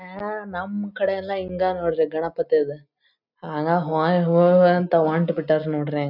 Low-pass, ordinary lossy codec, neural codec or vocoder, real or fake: 5.4 kHz; none; none; real